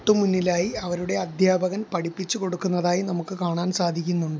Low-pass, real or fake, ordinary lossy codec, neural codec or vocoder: none; real; none; none